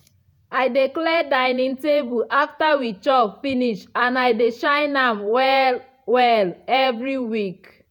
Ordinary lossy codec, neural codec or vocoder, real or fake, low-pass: none; vocoder, 48 kHz, 128 mel bands, Vocos; fake; 19.8 kHz